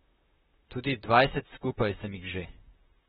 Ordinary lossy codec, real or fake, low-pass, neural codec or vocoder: AAC, 16 kbps; fake; 19.8 kHz; vocoder, 44.1 kHz, 128 mel bands, Pupu-Vocoder